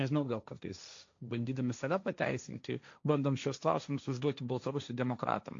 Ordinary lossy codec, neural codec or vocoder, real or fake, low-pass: AAC, 48 kbps; codec, 16 kHz, 1.1 kbps, Voila-Tokenizer; fake; 7.2 kHz